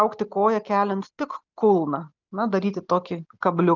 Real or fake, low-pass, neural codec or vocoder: real; 7.2 kHz; none